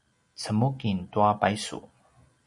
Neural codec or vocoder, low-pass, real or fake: none; 10.8 kHz; real